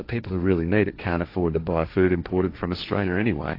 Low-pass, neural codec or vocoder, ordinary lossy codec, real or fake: 5.4 kHz; codec, 16 kHz, 1.1 kbps, Voila-Tokenizer; AAC, 32 kbps; fake